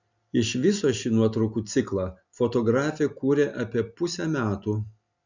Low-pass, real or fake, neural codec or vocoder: 7.2 kHz; real; none